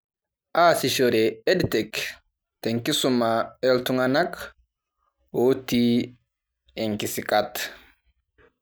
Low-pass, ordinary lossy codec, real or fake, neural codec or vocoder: none; none; real; none